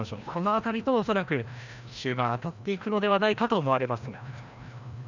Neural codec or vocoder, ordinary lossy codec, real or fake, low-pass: codec, 16 kHz, 1 kbps, FreqCodec, larger model; none; fake; 7.2 kHz